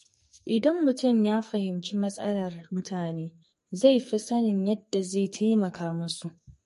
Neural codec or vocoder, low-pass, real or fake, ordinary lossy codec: codec, 44.1 kHz, 2.6 kbps, SNAC; 14.4 kHz; fake; MP3, 48 kbps